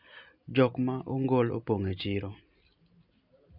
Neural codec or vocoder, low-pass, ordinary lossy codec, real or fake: none; 5.4 kHz; none; real